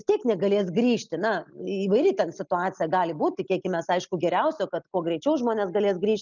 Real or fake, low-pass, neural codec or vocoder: real; 7.2 kHz; none